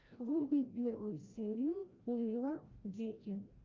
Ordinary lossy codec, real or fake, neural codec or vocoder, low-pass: Opus, 32 kbps; fake; codec, 16 kHz, 0.5 kbps, FreqCodec, larger model; 7.2 kHz